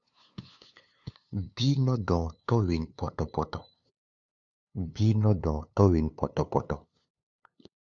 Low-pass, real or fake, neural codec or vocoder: 7.2 kHz; fake; codec, 16 kHz, 2 kbps, FunCodec, trained on LibriTTS, 25 frames a second